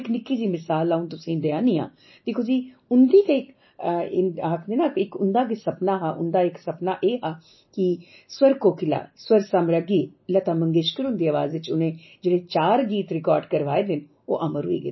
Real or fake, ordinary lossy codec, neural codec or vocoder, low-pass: real; MP3, 24 kbps; none; 7.2 kHz